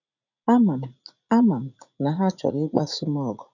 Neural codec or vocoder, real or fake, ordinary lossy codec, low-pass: vocoder, 44.1 kHz, 80 mel bands, Vocos; fake; none; 7.2 kHz